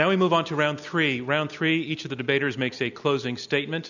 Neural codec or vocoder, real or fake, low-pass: none; real; 7.2 kHz